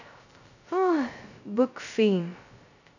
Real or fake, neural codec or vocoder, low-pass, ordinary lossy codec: fake; codec, 16 kHz, 0.2 kbps, FocalCodec; 7.2 kHz; none